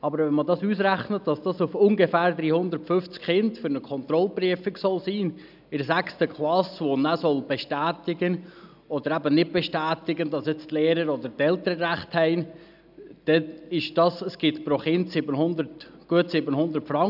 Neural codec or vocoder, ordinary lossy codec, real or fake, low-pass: none; none; real; 5.4 kHz